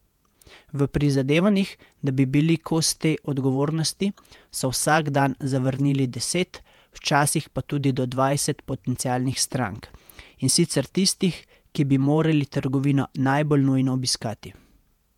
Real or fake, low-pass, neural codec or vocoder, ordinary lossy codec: fake; 19.8 kHz; vocoder, 48 kHz, 128 mel bands, Vocos; MP3, 96 kbps